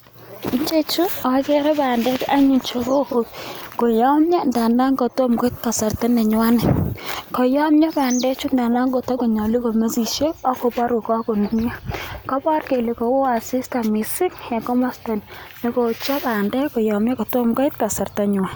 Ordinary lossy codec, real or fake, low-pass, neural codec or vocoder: none; real; none; none